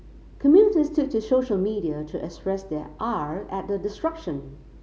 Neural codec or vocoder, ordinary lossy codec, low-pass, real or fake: none; none; none; real